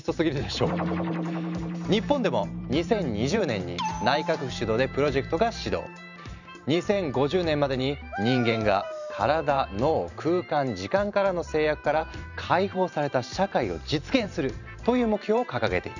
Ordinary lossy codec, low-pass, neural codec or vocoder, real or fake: none; 7.2 kHz; vocoder, 44.1 kHz, 128 mel bands every 512 samples, BigVGAN v2; fake